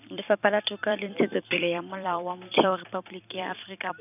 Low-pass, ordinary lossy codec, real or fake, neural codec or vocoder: 3.6 kHz; none; fake; vocoder, 44.1 kHz, 128 mel bands every 256 samples, BigVGAN v2